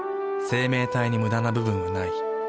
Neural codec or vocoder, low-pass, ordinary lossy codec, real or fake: none; none; none; real